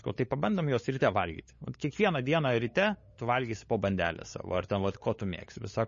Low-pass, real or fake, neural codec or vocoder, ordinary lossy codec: 7.2 kHz; fake; codec, 16 kHz, 8 kbps, FunCodec, trained on LibriTTS, 25 frames a second; MP3, 32 kbps